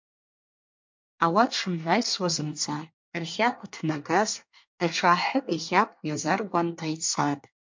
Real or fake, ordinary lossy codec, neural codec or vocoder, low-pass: fake; MP3, 48 kbps; codec, 24 kHz, 1 kbps, SNAC; 7.2 kHz